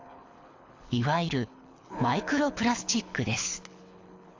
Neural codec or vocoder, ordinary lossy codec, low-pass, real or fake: codec, 24 kHz, 6 kbps, HILCodec; AAC, 48 kbps; 7.2 kHz; fake